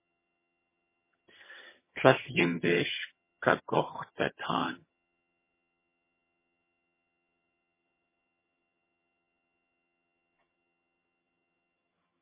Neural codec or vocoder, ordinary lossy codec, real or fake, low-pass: vocoder, 22.05 kHz, 80 mel bands, HiFi-GAN; MP3, 16 kbps; fake; 3.6 kHz